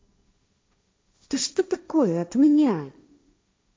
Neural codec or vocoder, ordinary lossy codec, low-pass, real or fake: codec, 16 kHz, 1.1 kbps, Voila-Tokenizer; none; none; fake